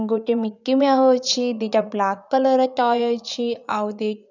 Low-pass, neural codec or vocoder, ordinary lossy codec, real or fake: 7.2 kHz; vocoder, 44.1 kHz, 80 mel bands, Vocos; none; fake